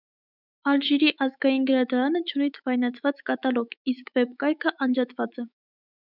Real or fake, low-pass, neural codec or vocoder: fake; 5.4 kHz; autoencoder, 48 kHz, 128 numbers a frame, DAC-VAE, trained on Japanese speech